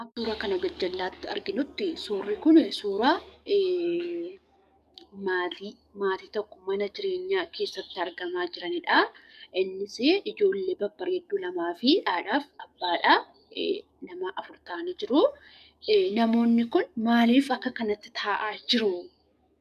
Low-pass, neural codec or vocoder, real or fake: 14.4 kHz; codec, 44.1 kHz, 7.8 kbps, DAC; fake